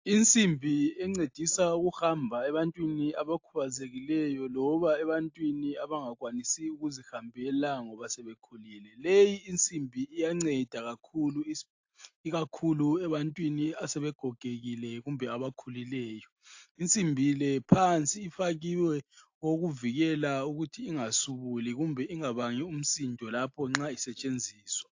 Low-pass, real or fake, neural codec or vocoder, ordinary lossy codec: 7.2 kHz; real; none; AAC, 48 kbps